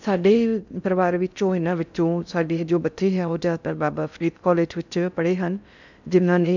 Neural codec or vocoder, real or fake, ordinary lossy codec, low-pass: codec, 16 kHz in and 24 kHz out, 0.6 kbps, FocalCodec, streaming, 4096 codes; fake; none; 7.2 kHz